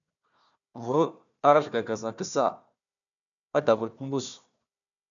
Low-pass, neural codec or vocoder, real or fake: 7.2 kHz; codec, 16 kHz, 1 kbps, FunCodec, trained on Chinese and English, 50 frames a second; fake